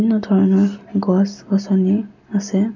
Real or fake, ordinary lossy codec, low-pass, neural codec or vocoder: real; none; 7.2 kHz; none